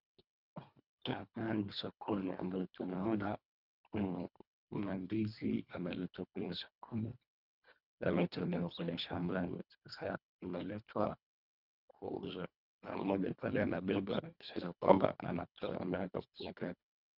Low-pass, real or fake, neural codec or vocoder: 5.4 kHz; fake; codec, 24 kHz, 1.5 kbps, HILCodec